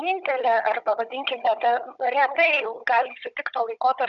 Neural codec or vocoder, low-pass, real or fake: codec, 16 kHz, 16 kbps, FunCodec, trained on Chinese and English, 50 frames a second; 7.2 kHz; fake